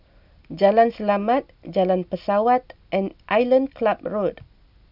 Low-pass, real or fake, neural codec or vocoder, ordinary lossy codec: 5.4 kHz; real; none; none